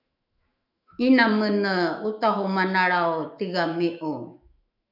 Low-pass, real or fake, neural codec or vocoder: 5.4 kHz; fake; autoencoder, 48 kHz, 128 numbers a frame, DAC-VAE, trained on Japanese speech